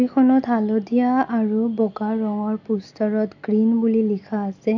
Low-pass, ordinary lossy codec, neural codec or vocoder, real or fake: 7.2 kHz; none; none; real